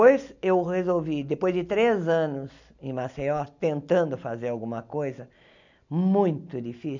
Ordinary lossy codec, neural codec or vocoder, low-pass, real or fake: none; none; 7.2 kHz; real